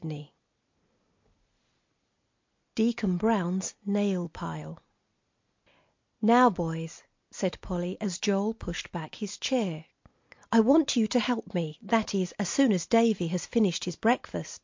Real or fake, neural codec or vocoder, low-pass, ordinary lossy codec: real; none; 7.2 kHz; MP3, 48 kbps